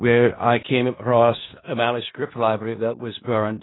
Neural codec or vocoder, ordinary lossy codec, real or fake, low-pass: codec, 16 kHz in and 24 kHz out, 0.4 kbps, LongCat-Audio-Codec, four codebook decoder; AAC, 16 kbps; fake; 7.2 kHz